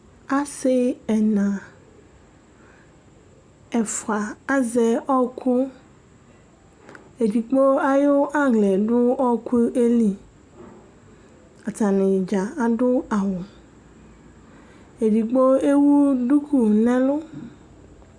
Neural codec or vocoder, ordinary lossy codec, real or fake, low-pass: none; Opus, 64 kbps; real; 9.9 kHz